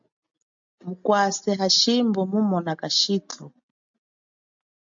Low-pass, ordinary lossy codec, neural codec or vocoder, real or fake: 7.2 kHz; AAC, 64 kbps; none; real